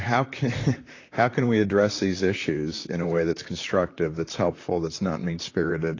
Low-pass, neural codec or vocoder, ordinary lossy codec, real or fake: 7.2 kHz; vocoder, 44.1 kHz, 128 mel bands, Pupu-Vocoder; AAC, 32 kbps; fake